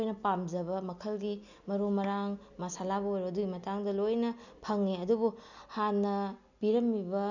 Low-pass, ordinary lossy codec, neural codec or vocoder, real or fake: 7.2 kHz; none; none; real